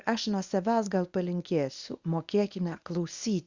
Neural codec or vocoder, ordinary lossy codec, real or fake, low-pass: codec, 24 kHz, 0.9 kbps, WavTokenizer, medium speech release version 1; Opus, 64 kbps; fake; 7.2 kHz